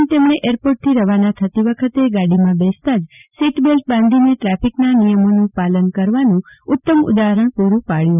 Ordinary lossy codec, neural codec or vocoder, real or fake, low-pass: none; none; real; 3.6 kHz